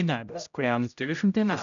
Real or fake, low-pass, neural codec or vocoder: fake; 7.2 kHz; codec, 16 kHz, 0.5 kbps, X-Codec, HuBERT features, trained on general audio